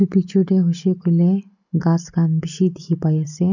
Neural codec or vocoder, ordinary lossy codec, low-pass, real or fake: none; none; 7.2 kHz; real